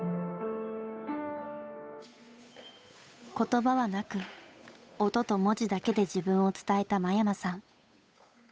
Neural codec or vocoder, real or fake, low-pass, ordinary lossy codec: codec, 16 kHz, 8 kbps, FunCodec, trained on Chinese and English, 25 frames a second; fake; none; none